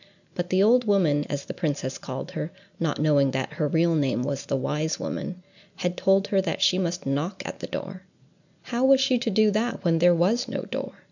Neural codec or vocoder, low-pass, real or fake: none; 7.2 kHz; real